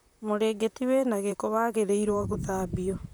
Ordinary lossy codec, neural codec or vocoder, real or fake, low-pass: none; vocoder, 44.1 kHz, 128 mel bands, Pupu-Vocoder; fake; none